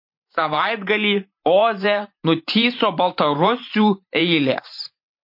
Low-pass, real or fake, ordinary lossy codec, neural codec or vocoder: 5.4 kHz; fake; MP3, 32 kbps; vocoder, 44.1 kHz, 128 mel bands every 512 samples, BigVGAN v2